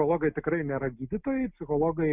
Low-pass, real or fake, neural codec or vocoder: 3.6 kHz; real; none